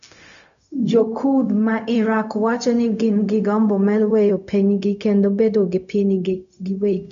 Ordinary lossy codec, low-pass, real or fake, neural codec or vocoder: none; 7.2 kHz; fake; codec, 16 kHz, 0.4 kbps, LongCat-Audio-Codec